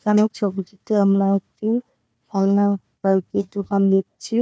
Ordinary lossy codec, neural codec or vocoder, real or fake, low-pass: none; codec, 16 kHz, 1 kbps, FunCodec, trained on Chinese and English, 50 frames a second; fake; none